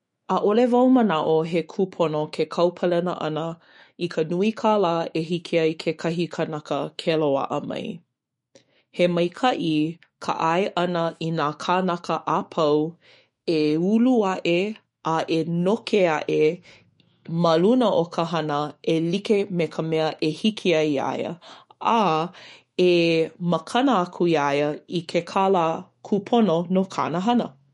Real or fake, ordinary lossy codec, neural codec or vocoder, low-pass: fake; MP3, 48 kbps; autoencoder, 48 kHz, 128 numbers a frame, DAC-VAE, trained on Japanese speech; 19.8 kHz